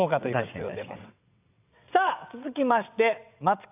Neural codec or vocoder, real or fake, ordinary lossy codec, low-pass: vocoder, 22.05 kHz, 80 mel bands, WaveNeXt; fake; AAC, 32 kbps; 3.6 kHz